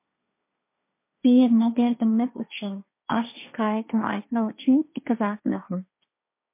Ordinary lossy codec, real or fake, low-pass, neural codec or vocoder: MP3, 24 kbps; fake; 3.6 kHz; codec, 16 kHz, 1.1 kbps, Voila-Tokenizer